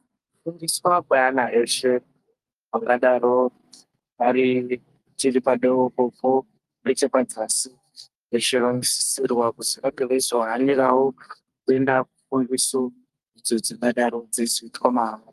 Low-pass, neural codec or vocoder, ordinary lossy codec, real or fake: 14.4 kHz; codec, 44.1 kHz, 2.6 kbps, SNAC; Opus, 32 kbps; fake